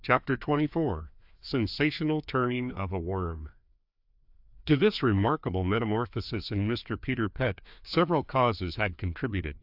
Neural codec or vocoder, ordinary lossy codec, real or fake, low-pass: codec, 16 kHz, 2 kbps, FreqCodec, larger model; AAC, 48 kbps; fake; 5.4 kHz